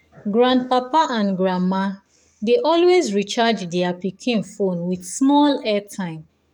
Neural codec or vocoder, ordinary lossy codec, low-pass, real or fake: codec, 44.1 kHz, 7.8 kbps, DAC; none; 19.8 kHz; fake